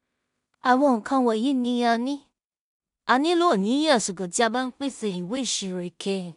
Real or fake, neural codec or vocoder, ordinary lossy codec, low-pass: fake; codec, 16 kHz in and 24 kHz out, 0.4 kbps, LongCat-Audio-Codec, two codebook decoder; none; 10.8 kHz